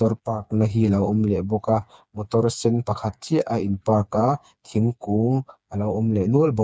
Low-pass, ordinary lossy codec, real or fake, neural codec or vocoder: none; none; fake; codec, 16 kHz, 4 kbps, FreqCodec, smaller model